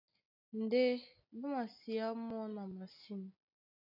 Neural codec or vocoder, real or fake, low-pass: none; real; 5.4 kHz